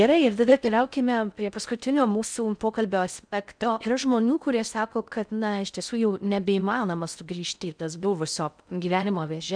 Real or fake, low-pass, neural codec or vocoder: fake; 9.9 kHz; codec, 16 kHz in and 24 kHz out, 0.6 kbps, FocalCodec, streaming, 4096 codes